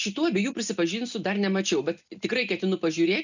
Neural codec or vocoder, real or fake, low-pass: none; real; 7.2 kHz